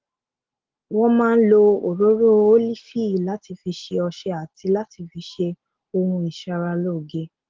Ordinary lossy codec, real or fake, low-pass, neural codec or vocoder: Opus, 16 kbps; real; 7.2 kHz; none